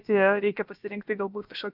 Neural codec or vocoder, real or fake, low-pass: codec, 16 kHz, about 1 kbps, DyCAST, with the encoder's durations; fake; 5.4 kHz